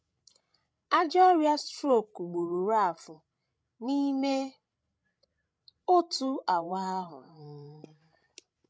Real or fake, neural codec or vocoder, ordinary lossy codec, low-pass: fake; codec, 16 kHz, 16 kbps, FreqCodec, larger model; none; none